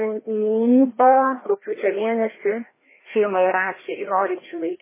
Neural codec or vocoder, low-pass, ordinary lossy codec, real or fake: codec, 16 kHz, 1 kbps, FreqCodec, larger model; 3.6 kHz; MP3, 16 kbps; fake